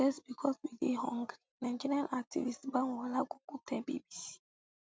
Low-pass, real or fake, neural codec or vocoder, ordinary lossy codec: none; real; none; none